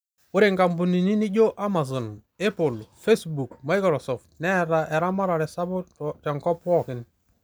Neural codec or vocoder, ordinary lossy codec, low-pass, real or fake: none; none; none; real